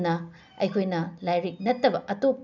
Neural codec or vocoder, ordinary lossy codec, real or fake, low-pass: none; none; real; 7.2 kHz